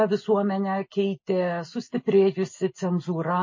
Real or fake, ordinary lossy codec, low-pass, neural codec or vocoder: real; MP3, 32 kbps; 7.2 kHz; none